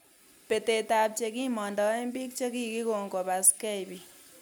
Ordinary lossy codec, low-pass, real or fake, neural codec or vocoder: none; none; real; none